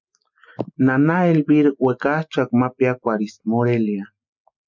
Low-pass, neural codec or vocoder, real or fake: 7.2 kHz; none; real